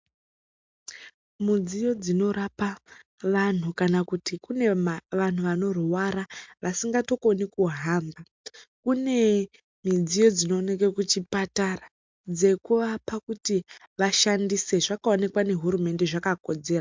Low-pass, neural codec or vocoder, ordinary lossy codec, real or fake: 7.2 kHz; none; MP3, 64 kbps; real